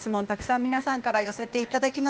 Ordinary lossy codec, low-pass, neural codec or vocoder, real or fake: none; none; codec, 16 kHz, 0.8 kbps, ZipCodec; fake